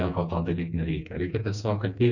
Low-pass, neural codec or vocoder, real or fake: 7.2 kHz; codec, 16 kHz, 2 kbps, FreqCodec, smaller model; fake